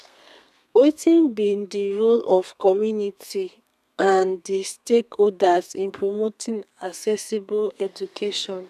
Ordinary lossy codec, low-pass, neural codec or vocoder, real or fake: none; 14.4 kHz; codec, 32 kHz, 1.9 kbps, SNAC; fake